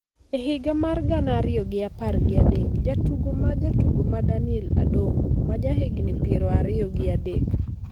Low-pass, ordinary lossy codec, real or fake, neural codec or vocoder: 19.8 kHz; Opus, 24 kbps; fake; codec, 44.1 kHz, 7.8 kbps, DAC